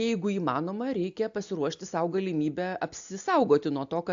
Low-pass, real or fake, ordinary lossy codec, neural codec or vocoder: 7.2 kHz; real; AAC, 64 kbps; none